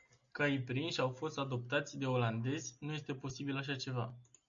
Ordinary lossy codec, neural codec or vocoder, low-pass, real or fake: MP3, 64 kbps; none; 7.2 kHz; real